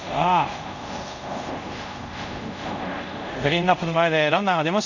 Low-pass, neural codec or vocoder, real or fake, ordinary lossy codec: 7.2 kHz; codec, 24 kHz, 0.5 kbps, DualCodec; fake; none